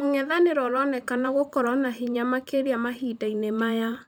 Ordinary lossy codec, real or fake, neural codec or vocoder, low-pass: none; fake; vocoder, 44.1 kHz, 128 mel bands every 512 samples, BigVGAN v2; none